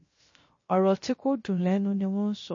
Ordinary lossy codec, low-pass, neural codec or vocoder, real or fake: MP3, 32 kbps; 7.2 kHz; codec, 16 kHz, 0.3 kbps, FocalCodec; fake